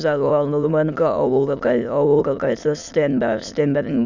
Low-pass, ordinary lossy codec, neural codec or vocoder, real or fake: 7.2 kHz; none; autoencoder, 22.05 kHz, a latent of 192 numbers a frame, VITS, trained on many speakers; fake